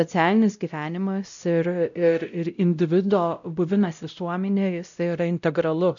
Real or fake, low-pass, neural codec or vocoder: fake; 7.2 kHz; codec, 16 kHz, 0.5 kbps, X-Codec, WavLM features, trained on Multilingual LibriSpeech